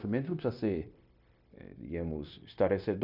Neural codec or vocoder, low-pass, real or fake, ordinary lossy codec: codec, 16 kHz, 0.9 kbps, LongCat-Audio-Codec; 5.4 kHz; fake; none